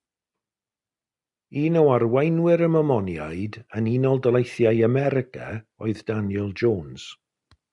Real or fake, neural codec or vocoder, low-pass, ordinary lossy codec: real; none; 10.8 kHz; MP3, 96 kbps